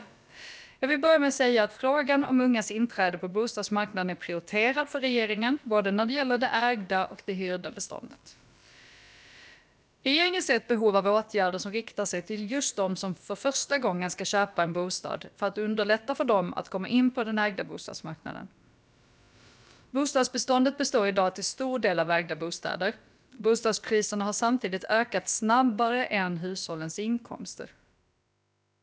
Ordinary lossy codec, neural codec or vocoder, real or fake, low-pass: none; codec, 16 kHz, about 1 kbps, DyCAST, with the encoder's durations; fake; none